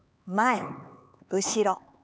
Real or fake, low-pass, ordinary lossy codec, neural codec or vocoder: fake; none; none; codec, 16 kHz, 4 kbps, X-Codec, HuBERT features, trained on LibriSpeech